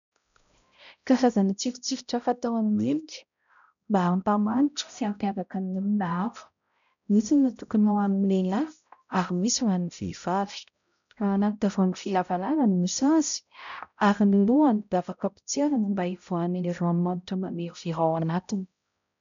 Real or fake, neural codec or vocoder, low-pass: fake; codec, 16 kHz, 0.5 kbps, X-Codec, HuBERT features, trained on balanced general audio; 7.2 kHz